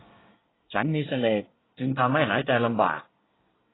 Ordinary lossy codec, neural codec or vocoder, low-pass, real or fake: AAC, 16 kbps; codec, 24 kHz, 1 kbps, SNAC; 7.2 kHz; fake